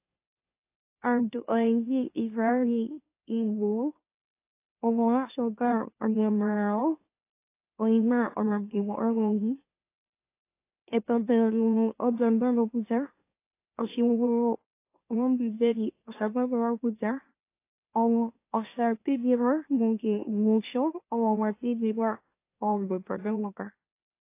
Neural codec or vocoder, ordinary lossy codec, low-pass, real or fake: autoencoder, 44.1 kHz, a latent of 192 numbers a frame, MeloTTS; AAC, 24 kbps; 3.6 kHz; fake